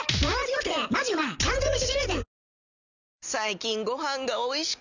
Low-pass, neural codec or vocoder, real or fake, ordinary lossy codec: 7.2 kHz; none; real; none